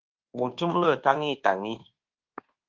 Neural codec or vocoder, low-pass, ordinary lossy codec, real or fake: codec, 24 kHz, 1.2 kbps, DualCodec; 7.2 kHz; Opus, 16 kbps; fake